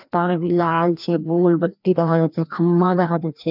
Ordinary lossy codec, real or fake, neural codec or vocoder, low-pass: none; fake; codec, 16 kHz, 1 kbps, FreqCodec, larger model; 5.4 kHz